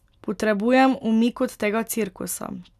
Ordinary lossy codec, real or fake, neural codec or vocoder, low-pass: none; real; none; 14.4 kHz